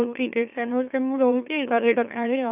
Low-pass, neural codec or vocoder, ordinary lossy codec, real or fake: 3.6 kHz; autoencoder, 44.1 kHz, a latent of 192 numbers a frame, MeloTTS; none; fake